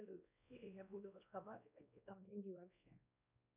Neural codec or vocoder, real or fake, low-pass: codec, 16 kHz, 1 kbps, X-Codec, WavLM features, trained on Multilingual LibriSpeech; fake; 3.6 kHz